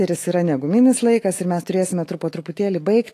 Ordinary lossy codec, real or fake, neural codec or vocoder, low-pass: AAC, 48 kbps; fake; autoencoder, 48 kHz, 128 numbers a frame, DAC-VAE, trained on Japanese speech; 14.4 kHz